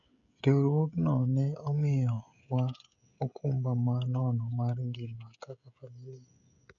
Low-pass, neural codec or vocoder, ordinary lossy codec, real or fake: 7.2 kHz; codec, 16 kHz, 16 kbps, FreqCodec, smaller model; none; fake